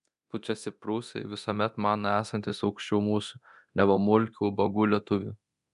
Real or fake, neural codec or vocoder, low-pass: fake; codec, 24 kHz, 0.9 kbps, DualCodec; 10.8 kHz